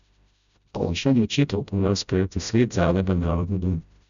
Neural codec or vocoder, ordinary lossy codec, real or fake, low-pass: codec, 16 kHz, 0.5 kbps, FreqCodec, smaller model; none; fake; 7.2 kHz